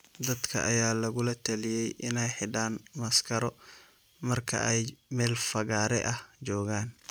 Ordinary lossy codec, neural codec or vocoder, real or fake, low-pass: none; none; real; none